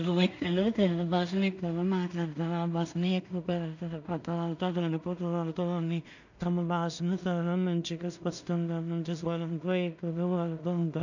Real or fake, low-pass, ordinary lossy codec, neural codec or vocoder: fake; 7.2 kHz; none; codec, 16 kHz in and 24 kHz out, 0.4 kbps, LongCat-Audio-Codec, two codebook decoder